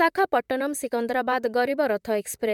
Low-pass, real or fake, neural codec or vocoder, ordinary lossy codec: 19.8 kHz; fake; vocoder, 44.1 kHz, 128 mel bands, Pupu-Vocoder; MP3, 96 kbps